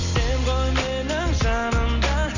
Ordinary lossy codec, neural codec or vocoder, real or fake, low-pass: Opus, 64 kbps; none; real; 7.2 kHz